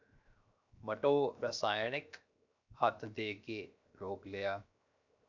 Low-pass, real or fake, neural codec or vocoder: 7.2 kHz; fake; codec, 16 kHz, 0.7 kbps, FocalCodec